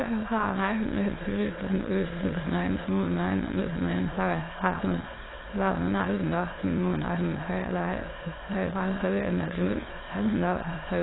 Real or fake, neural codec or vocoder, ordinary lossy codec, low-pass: fake; autoencoder, 22.05 kHz, a latent of 192 numbers a frame, VITS, trained on many speakers; AAC, 16 kbps; 7.2 kHz